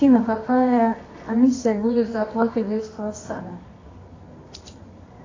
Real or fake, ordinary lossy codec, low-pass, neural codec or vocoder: fake; AAC, 32 kbps; 7.2 kHz; codec, 24 kHz, 0.9 kbps, WavTokenizer, medium music audio release